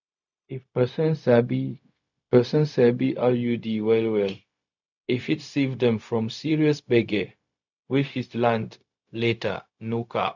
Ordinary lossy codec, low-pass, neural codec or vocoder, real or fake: none; 7.2 kHz; codec, 16 kHz, 0.4 kbps, LongCat-Audio-Codec; fake